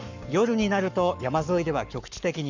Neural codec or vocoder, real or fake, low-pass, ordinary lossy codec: codec, 44.1 kHz, 7.8 kbps, Pupu-Codec; fake; 7.2 kHz; none